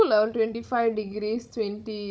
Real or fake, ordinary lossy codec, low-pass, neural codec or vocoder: fake; none; none; codec, 16 kHz, 16 kbps, FunCodec, trained on Chinese and English, 50 frames a second